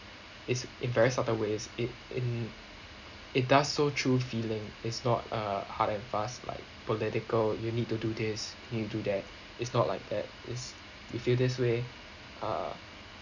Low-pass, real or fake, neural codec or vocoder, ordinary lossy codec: 7.2 kHz; real; none; none